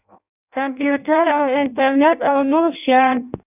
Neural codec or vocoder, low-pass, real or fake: codec, 16 kHz in and 24 kHz out, 0.6 kbps, FireRedTTS-2 codec; 3.6 kHz; fake